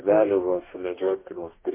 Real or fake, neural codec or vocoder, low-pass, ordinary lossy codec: fake; codec, 44.1 kHz, 2.6 kbps, DAC; 3.6 kHz; MP3, 24 kbps